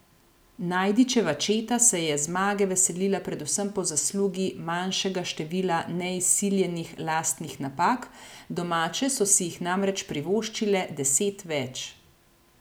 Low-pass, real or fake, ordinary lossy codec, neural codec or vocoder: none; real; none; none